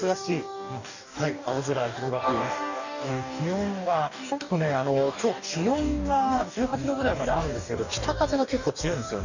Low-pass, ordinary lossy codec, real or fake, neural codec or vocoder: 7.2 kHz; none; fake; codec, 44.1 kHz, 2.6 kbps, DAC